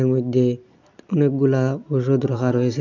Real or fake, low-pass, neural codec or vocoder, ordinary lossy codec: real; 7.2 kHz; none; none